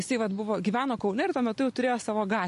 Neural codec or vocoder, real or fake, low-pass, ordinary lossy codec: none; real; 14.4 kHz; MP3, 48 kbps